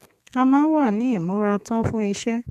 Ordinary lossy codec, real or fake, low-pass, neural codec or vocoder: none; fake; 14.4 kHz; codec, 32 kHz, 1.9 kbps, SNAC